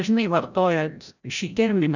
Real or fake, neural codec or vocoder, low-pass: fake; codec, 16 kHz, 0.5 kbps, FreqCodec, larger model; 7.2 kHz